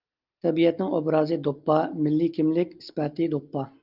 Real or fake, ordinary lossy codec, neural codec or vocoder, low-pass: real; Opus, 32 kbps; none; 5.4 kHz